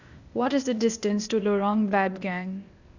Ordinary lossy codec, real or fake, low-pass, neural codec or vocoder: none; fake; 7.2 kHz; codec, 16 kHz, 0.8 kbps, ZipCodec